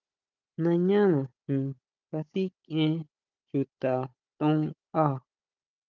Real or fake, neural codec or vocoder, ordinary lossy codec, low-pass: fake; codec, 16 kHz, 16 kbps, FunCodec, trained on Chinese and English, 50 frames a second; Opus, 24 kbps; 7.2 kHz